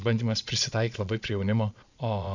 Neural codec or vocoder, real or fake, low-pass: vocoder, 22.05 kHz, 80 mel bands, Vocos; fake; 7.2 kHz